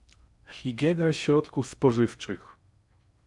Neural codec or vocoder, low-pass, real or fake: codec, 16 kHz in and 24 kHz out, 0.6 kbps, FocalCodec, streaming, 2048 codes; 10.8 kHz; fake